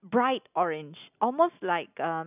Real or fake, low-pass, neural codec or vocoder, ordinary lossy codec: real; 3.6 kHz; none; none